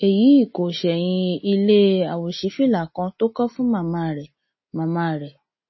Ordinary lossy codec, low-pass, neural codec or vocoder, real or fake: MP3, 24 kbps; 7.2 kHz; none; real